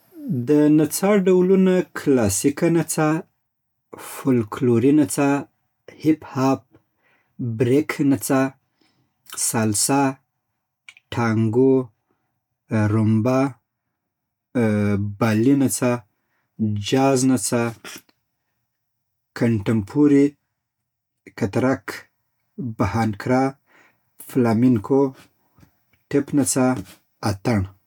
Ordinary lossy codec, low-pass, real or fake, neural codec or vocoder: none; 19.8 kHz; real; none